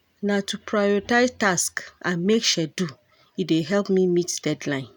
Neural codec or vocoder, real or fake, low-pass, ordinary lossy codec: none; real; none; none